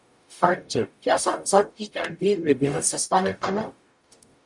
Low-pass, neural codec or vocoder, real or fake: 10.8 kHz; codec, 44.1 kHz, 0.9 kbps, DAC; fake